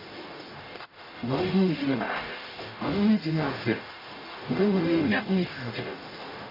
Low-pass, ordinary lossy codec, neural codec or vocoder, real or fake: 5.4 kHz; none; codec, 44.1 kHz, 0.9 kbps, DAC; fake